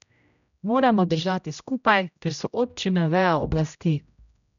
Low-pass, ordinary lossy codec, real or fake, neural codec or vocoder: 7.2 kHz; none; fake; codec, 16 kHz, 0.5 kbps, X-Codec, HuBERT features, trained on general audio